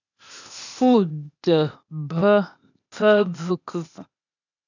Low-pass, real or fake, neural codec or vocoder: 7.2 kHz; fake; codec, 16 kHz, 0.8 kbps, ZipCodec